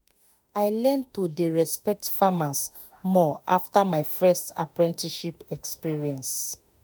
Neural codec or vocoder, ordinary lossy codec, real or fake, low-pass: autoencoder, 48 kHz, 32 numbers a frame, DAC-VAE, trained on Japanese speech; none; fake; none